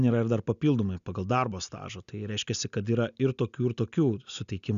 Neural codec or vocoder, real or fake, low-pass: none; real; 7.2 kHz